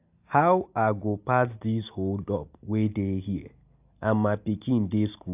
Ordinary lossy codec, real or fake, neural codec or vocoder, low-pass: AAC, 32 kbps; real; none; 3.6 kHz